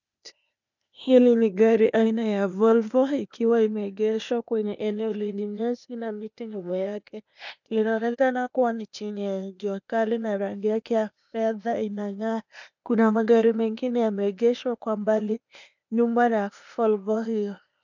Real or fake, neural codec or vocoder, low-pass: fake; codec, 16 kHz, 0.8 kbps, ZipCodec; 7.2 kHz